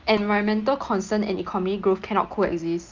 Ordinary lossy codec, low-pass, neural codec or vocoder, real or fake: Opus, 24 kbps; 7.2 kHz; none; real